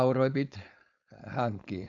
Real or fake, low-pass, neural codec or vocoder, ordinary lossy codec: fake; 7.2 kHz; codec, 16 kHz, 4.8 kbps, FACodec; none